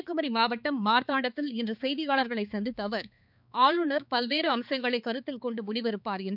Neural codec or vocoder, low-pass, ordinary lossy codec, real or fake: codec, 16 kHz, 4 kbps, X-Codec, HuBERT features, trained on balanced general audio; 5.4 kHz; none; fake